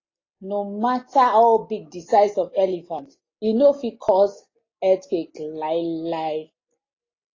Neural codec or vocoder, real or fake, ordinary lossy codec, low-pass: none; real; AAC, 32 kbps; 7.2 kHz